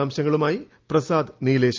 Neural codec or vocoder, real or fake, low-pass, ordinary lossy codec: none; real; 7.2 kHz; Opus, 24 kbps